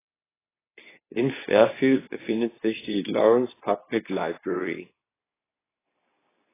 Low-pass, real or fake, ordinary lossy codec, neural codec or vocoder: 3.6 kHz; fake; AAC, 16 kbps; codec, 24 kHz, 0.9 kbps, WavTokenizer, medium speech release version 2